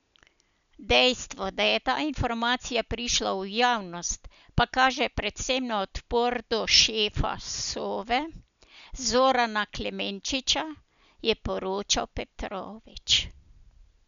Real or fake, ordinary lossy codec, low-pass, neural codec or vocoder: real; none; 7.2 kHz; none